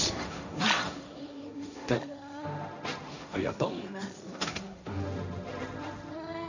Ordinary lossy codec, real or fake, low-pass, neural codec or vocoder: none; fake; 7.2 kHz; codec, 16 kHz, 1.1 kbps, Voila-Tokenizer